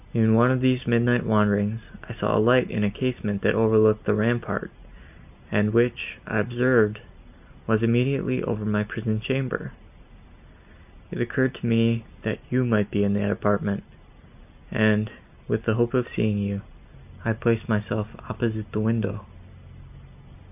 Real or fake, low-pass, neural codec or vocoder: real; 3.6 kHz; none